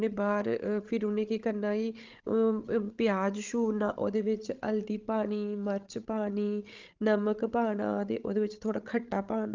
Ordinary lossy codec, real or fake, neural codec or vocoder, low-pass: Opus, 32 kbps; fake; codec, 16 kHz, 16 kbps, FreqCodec, larger model; 7.2 kHz